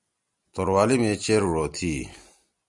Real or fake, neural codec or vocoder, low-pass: real; none; 10.8 kHz